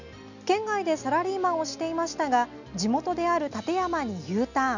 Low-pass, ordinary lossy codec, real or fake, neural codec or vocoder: 7.2 kHz; none; real; none